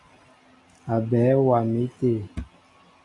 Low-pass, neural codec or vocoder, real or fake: 10.8 kHz; none; real